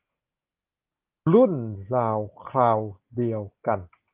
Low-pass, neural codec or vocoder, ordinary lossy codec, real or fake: 3.6 kHz; none; Opus, 24 kbps; real